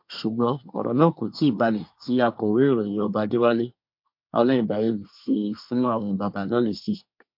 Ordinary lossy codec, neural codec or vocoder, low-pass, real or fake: MP3, 48 kbps; codec, 24 kHz, 1 kbps, SNAC; 5.4 kHz; fake